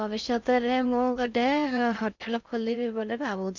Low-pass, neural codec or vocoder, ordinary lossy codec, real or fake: 7.2 kHz; codec, 16 kHz in and 24 kHz out, 0.6 kbps, FocalCodec, streaming, 4096 codes; none; fake